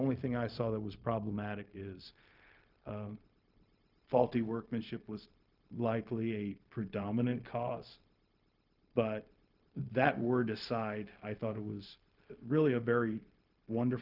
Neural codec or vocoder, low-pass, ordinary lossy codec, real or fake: codec, 16 kHz, 0.4 kbps, LongCat-Audio-Codec; 5.4 kHz; Opus, 24 kbps; fake